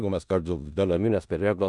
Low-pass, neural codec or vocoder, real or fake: 10.8 kHz; codec, 16 kHz in and 24 kHz out, 0.4 kbps, LongCat-Audio-Codec, four codebook decoder; fake